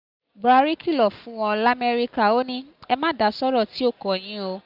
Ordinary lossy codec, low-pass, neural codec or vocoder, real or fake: Opus, 64 kbps; 5.4 kHz; none; real